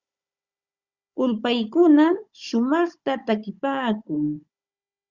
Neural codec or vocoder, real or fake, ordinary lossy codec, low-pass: codec, 16 kHz, 16 kbps, FunCodec, trained on Chinese and English, 50 frames a second; fake; Opus, 64 kbps; 7.2 kHz